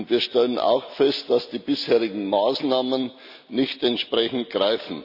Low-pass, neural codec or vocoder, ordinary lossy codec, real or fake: 5.4 kHz; none; none; real